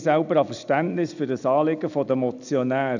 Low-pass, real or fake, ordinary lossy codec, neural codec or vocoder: 7.2 kHz; real; none; none